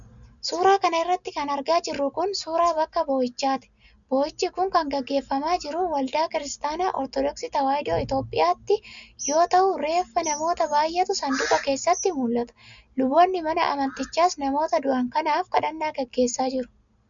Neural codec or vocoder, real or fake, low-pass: none; real; 7.2 kHz